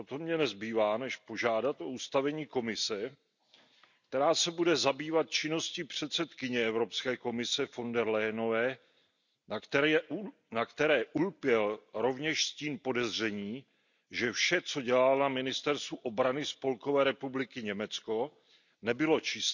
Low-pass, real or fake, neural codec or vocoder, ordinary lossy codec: 7.2 kHz; real; none; none